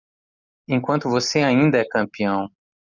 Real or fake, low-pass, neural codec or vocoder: real; 7.2 kHz; none